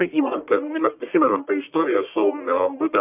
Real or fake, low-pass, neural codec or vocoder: fake; 3.6 kHz; codec, 44.1 kHz, 1.7 kbps, Pupu-Codec